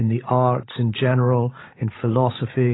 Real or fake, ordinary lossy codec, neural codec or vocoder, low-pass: fake; AAC, 16 kbps; codec, 16 kHz, 16 kbps, FreqCodec, larger model; 7.2 kHz